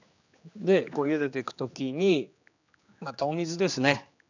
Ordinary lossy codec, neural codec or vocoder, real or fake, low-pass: none; codec, 16 kHz, 2 kbps, X-Codec, HuBERT features, trained on general audio; fake; 7.2 kHz